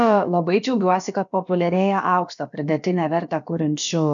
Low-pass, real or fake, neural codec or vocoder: 7.2 kHz; fake; codec, 16 kHz, about 1 kbps, DyCAST, with the encoder's durations